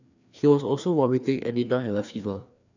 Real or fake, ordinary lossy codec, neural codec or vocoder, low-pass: fake; none; codec, 16 kHz, 2 kbps, FreqCodec, larger model; 7.2 kHz